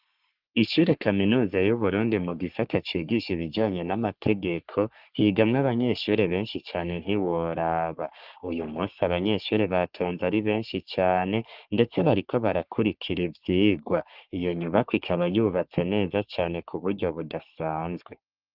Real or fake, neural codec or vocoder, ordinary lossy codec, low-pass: fake; codec, 44.1 kHz, 3.4 kbps, Pupu-Codec; Opus, 24 kbps; 5.4 kHz